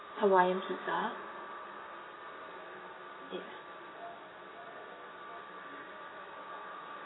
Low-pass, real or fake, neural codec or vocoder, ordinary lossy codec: 7.2 kHz; real; none; AAC, 16 kbps